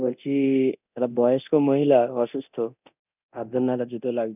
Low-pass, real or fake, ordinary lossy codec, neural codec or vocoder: 3.6 kHz; fake; none; codec, 24 kHz, 0.9 kbps, DualCodec